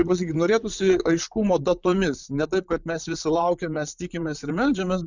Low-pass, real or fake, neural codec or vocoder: 7.2 kHz; fake; vocoder, 44.1 kHz, 128 mel bands, Pupu-Vocoder